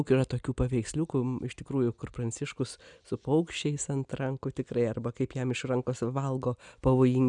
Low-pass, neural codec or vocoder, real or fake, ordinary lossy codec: 9.9 kHz; none; real; MP3, 96 kbps